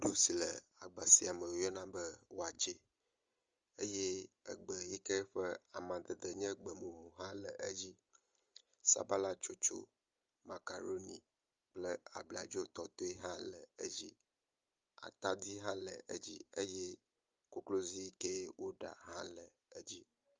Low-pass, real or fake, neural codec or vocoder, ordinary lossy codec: 7.2 kHz; real; none; Opus, 32 kbps